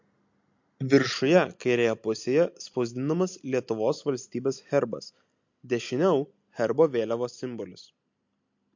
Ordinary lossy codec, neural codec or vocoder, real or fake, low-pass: MP3, 48 kbps; none; real; 7.2 kHz